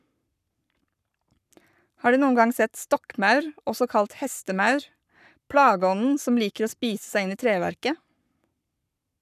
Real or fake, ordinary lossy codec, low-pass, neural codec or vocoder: fake; none; 14.4 kHz; codec, 44.1 kHz, 7.8 kbps, Pupu-Codec